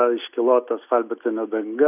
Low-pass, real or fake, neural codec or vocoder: 3.6 kHz; real; none